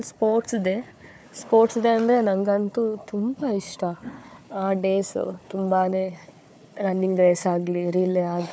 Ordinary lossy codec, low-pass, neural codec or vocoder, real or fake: none; none; codec, 16 kHz, 4 kbps, FunCodec, trained on Chinese and English, 50 frames a second; fake